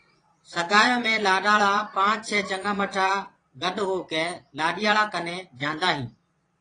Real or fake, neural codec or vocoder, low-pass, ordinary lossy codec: fake; vocoder, 22.05 kHz, 80 mel bands, Vocos; 9.9 kHz; AAC, 32 kbps